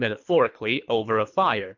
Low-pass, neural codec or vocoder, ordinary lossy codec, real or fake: 7.2 kHz; codec, 24 kHz, 3 kbps, HILCodec; AAC, 48 kbps; fake